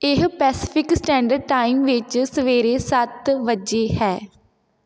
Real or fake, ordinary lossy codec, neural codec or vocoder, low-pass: real; none; none; none